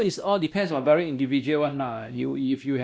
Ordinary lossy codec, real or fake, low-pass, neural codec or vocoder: none; fake; none; codec, 16 kHz, 1 kbps, X-Codec, WavLM features, trained on Multilingual LibriSpeech